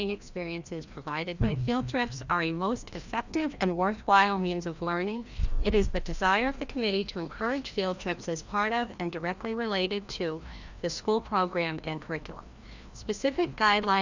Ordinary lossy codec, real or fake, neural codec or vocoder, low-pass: Opus, 64 kbps; fake; codec, 16 kHz, 1 kbps, FreqCodec, larger model; 7.2 kHz